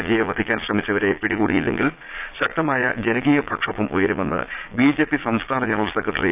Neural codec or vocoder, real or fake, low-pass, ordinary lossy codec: vocoder, 22.05 kHz, 80 mel bands, Vocos; fake; 3.6 kHz; none